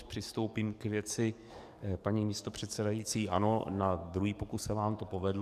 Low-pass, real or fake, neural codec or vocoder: 14.4 kHz; fake; codec, 44.1 kHz, 7.8 kbps, DAC